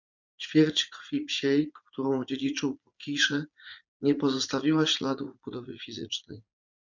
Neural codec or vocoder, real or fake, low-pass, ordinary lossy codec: vocoder, 44.1 kHz, 80 mel bands, Vocos; fake; 7.2 kHz; MP3, 64 kbps